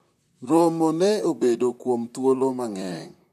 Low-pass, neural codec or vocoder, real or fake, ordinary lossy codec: 14.4 kHz; vocoder, 44.1 kHz, 128 mel bands, Pupu-Vocoder; fake; none